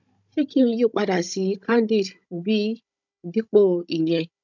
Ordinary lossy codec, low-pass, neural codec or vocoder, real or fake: none; 7.2 kHz; codec, 16 kHz, 16 kbps, FunCodec, trained on Chinese and English, 50 frames a second; fake